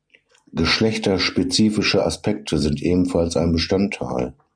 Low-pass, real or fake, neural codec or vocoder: 9.9 kHz; real; none